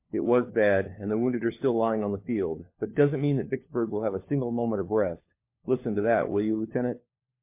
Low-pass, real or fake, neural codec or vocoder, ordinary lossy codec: 3.6 kHz; fake; codec, 16 kHz, 4 kbps, FunCodec, trained on LibriTTS, 50 frames a second; MP3, 24 kbps